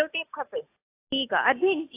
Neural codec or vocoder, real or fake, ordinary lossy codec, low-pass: none; real; AAC, 16 kbps; 3.6 kHz